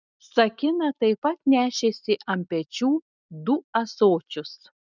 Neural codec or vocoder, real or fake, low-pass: none; real; 7.2 kHz